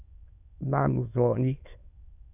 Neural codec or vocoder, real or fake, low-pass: autoencoder, 22.05 kHz, a latent of 192 numbers a frame, VITS, trained on many speakers; fake; 3.6 kHz